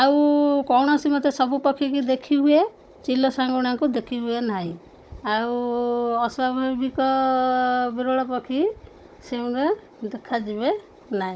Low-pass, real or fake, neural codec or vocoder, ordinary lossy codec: none; fake; codec, 16 kHz, 16 kbps, FunCodec, trained on Chinese and English, 50 frames a second; none